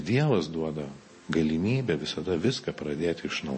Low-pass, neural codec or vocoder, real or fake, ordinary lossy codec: 9.9 kHz; none; real; MP3, 32 kbps